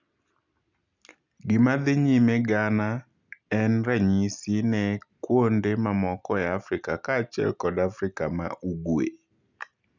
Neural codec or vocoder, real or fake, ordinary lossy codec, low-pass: none; real; none; 7.2 kHz